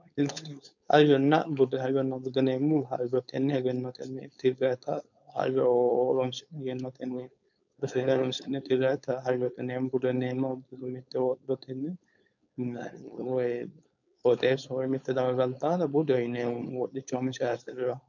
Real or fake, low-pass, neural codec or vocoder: fake; 7.2 kHz; codec, 16 kHz, 4.8 kbps, FACodec